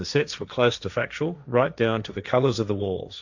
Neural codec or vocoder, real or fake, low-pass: codec, 16 kHz, 1.1 kbps, Voila-Tokenizer; fake; 7.2 kHz